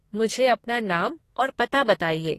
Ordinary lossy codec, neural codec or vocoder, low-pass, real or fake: AAC, 48 kbps; codec, 44.1 kHz, 2.6 kbps, SNAC; 14.4 kHz; fake